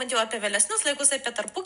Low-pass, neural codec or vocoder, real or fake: 10.8 kHz; none; real